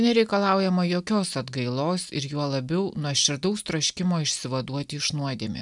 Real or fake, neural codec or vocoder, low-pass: real; none; 10.8 kHz